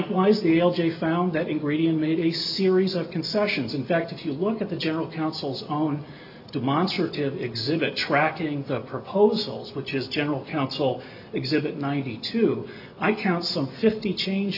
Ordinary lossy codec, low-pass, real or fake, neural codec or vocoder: AAC, 48 kbps; 5.4 kHz; real; none